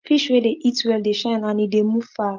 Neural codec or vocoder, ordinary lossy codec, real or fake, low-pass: none; Opus, 24 kbps; real; 7.2 kHz